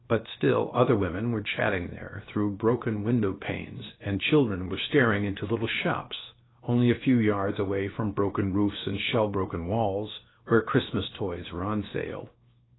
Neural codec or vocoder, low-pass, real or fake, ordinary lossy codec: codec, 16 kHz, 0.7 kbps, FocalCodec; 7.2 kHz; fake; AAC, 16 kbps